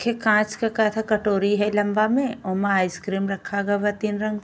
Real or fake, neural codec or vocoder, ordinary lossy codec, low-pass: real; none; none; none